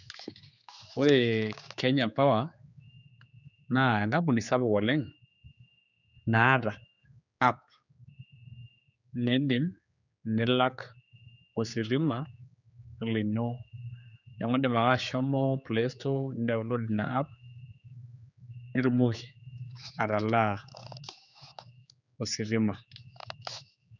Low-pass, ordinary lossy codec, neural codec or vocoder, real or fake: 7.2 kHz; none; codec, 16 kHz, 4 kbps, X-Codec, HuBERT features, trained on general audio; fake